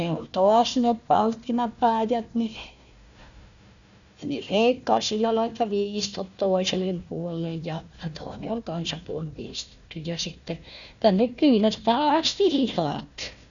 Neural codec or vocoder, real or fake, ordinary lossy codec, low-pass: codec, 16 kHz, 1 kbps, FunCodec, trained on Chinese and English, 50 frames a second; fake; none; 7.2 kHz